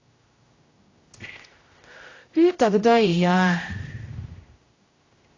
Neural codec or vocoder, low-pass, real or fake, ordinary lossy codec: codec, 16 kHz, 0.5 kbps, X-Codec, HuBERT features, trained on general audio; 7.2 kHz; fake; AAC, 32 kbps